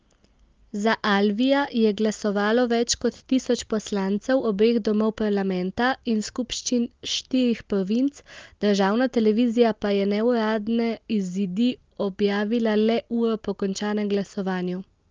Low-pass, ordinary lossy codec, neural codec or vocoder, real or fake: 7.2 kHz; Opus, 24 kbps; none; real